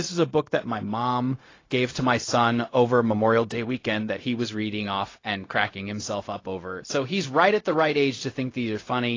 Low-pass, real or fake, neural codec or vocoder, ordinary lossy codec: 7.2 kHz; fake; codec, 16 kHz, 0.4 kbps, LongCat-Audio-Codec; AAC, 32 kbps